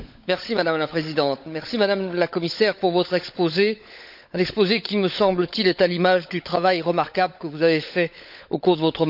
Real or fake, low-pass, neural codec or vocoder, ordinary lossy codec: fake; 5.4 kHz; codec, 16 kHz, 16 kbps, FunCodec, trained on LibriTTS, 50 frames a second; none